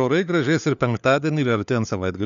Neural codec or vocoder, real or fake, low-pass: codec, 16 kHz, 4 kbps, FunCodec, trained on LibriTTS, 50 frames a second; fake; 7.2 kHz